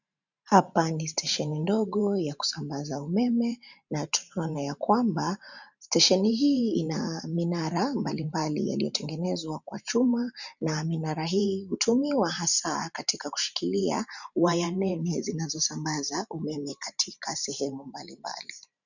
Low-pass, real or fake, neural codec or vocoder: 7.2 kHz; fake; vocoder, 44.1 kHz, 128 mel bands every 512 samples, BigVGAN v2